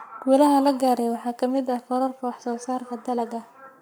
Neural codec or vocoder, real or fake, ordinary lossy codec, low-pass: codec, 44.1 kHz, 7.8 kbps, Pupu-Codec; fake; none; none